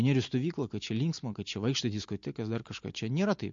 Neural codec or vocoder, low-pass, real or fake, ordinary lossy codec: none; 7.2 kHz; real; MP3, 48 kbps